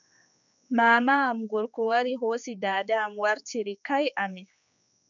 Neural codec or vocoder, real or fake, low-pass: codec, 16 kHz, 4 kbps, X-Codec, HuBERT features, trained on general audio; fake; 7.2 kHz